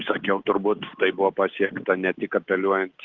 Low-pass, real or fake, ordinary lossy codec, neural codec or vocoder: 7.2 kHz; real; Opus, 16 kbps; none